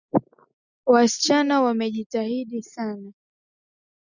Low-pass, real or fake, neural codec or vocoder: 7.2 kHz; real; none